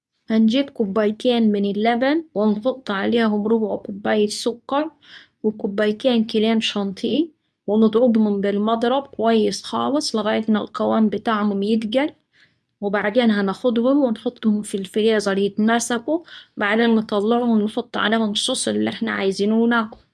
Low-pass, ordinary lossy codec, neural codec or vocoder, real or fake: none; none; codec, 24 kHz, 0.9 kbps, WavTokenizer, medium speech release version 2; fake